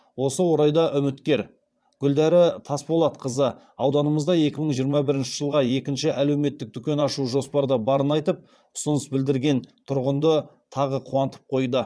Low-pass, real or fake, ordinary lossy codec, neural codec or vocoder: none; fake; none; vocoder, 22.05 kHz, 80 mel bands, Vocos